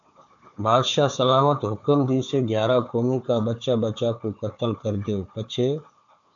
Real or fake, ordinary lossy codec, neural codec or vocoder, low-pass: fake; AAC, 64 kbps; codec, 16 kHz, 4 kbps, FunCodec, trained on Chinese and English, 50 frames a second; 7.2 kHz